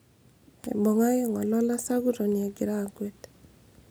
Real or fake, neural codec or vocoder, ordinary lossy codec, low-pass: real; none; none; none